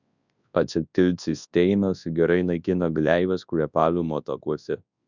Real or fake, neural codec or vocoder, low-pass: fake; codec, 24 kHz, 0.9 kbps, WavTokenizer, large speech release; 7.2 kHz